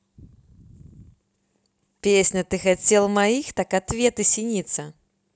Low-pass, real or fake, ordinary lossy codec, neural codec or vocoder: none; real; none; none